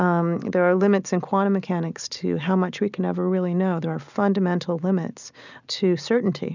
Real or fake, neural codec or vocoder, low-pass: real; none; 7.2 kHz